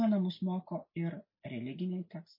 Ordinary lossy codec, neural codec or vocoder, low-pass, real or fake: MP3, 24 kbps; none; 5.4 kHz; real